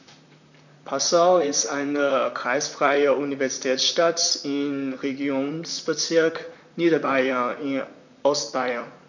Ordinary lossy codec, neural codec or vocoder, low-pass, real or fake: none; vocoder, 44.1 kHz, 128 mel bands, Pupu-Vocoder; 7.2 kHz; fake